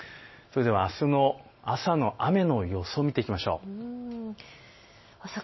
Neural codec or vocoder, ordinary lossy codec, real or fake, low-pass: none; MP3, 24 kbps; real; 7.2 kHz